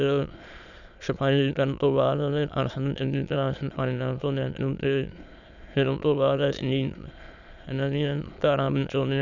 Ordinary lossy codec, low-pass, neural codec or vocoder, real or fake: none; 7.2 kHz; autoencoder, 22.05 kHz, a latent of 192 numbers a frame, VITS, trained on many speakers; fake